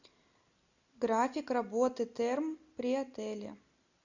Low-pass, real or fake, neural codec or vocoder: 7.2 kHz; real; none